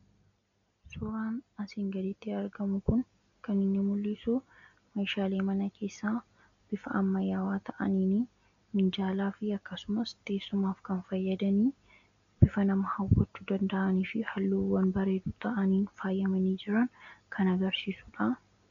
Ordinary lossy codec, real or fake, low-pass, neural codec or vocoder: MP3, 64 kbps; real; 7.2 kHz; none